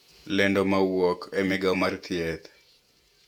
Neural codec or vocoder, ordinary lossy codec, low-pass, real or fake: vocoder, 48 kHz, 128 mel bands, Vocos; none; 19.8 kHz; fake